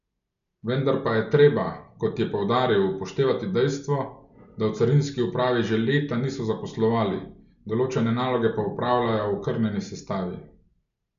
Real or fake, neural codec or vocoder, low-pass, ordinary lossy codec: real; none; 7.2 kHz; none